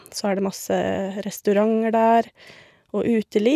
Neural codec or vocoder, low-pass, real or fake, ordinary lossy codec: none; 14.4 kHz; real; none